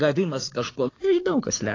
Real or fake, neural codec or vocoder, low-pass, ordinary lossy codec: fake; codec, 16 kHz, 6 kbps, DAC; 7.2 kHz; AAC, 32 kbps